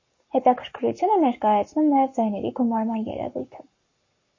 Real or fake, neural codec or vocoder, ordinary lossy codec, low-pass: fake; codec, 44.1 kHz, 7.8 kbps, Pupu-Codec; MP3, 32 kbps; 7.2 kHz